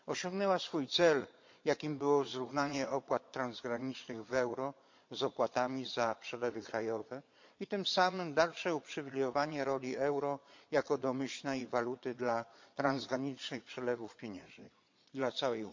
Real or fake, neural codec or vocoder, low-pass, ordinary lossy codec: fake; vocoder, 22.05 kHz, 80 mel bands, Vocos; 7.2 kHz; MP3, 48 kbps